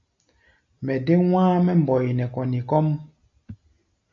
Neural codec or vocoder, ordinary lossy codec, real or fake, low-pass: none; MP3, 48 kbps; real; 7.2 kHz